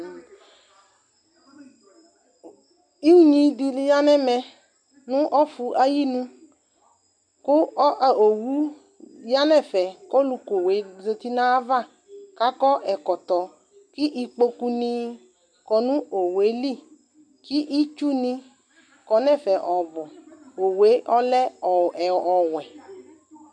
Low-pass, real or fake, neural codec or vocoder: 9.9 kHz; real; none